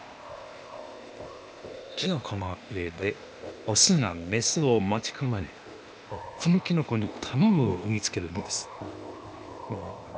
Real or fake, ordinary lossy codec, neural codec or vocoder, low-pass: fake; none; codec, 16 kHz, 0.8 kbps, ZipCodec; none